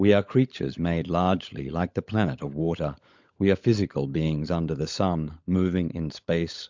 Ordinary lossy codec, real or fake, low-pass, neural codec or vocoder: MP3, 64 kbps; fake; 7.2 kHz; codec, 16 kHz, 16 kbps, FunCodec, trained on LibriTTS, 50 frames a second